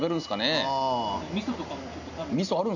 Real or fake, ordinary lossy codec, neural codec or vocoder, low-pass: real; none; none; 7.2 kHz